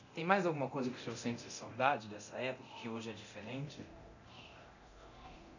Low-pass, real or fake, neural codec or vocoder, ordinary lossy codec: 7.2 kHz; fake; codec, 24 kHz, 0.9 kbps, DualCodec; none